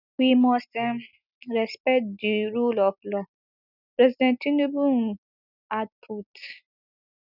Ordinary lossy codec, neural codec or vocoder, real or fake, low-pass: none; none; real; 5.4 kHz